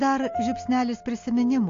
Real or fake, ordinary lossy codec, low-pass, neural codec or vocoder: real; AAC, 48 kbps; 7.2 kHz; none